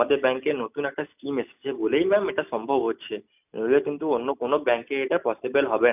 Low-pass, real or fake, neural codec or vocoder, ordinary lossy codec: 3.6 kHz; real; none; none